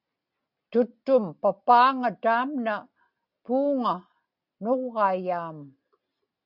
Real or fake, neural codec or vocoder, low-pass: real; none; 5.4 kHz